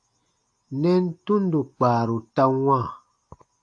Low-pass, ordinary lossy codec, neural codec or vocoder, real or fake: 9.9 kHz; AAC, 48 kbps; none; real